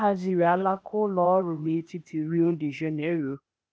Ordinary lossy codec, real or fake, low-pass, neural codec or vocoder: none; fake; none; codec, 16 kHz, 0.8 kbps, ZipCodec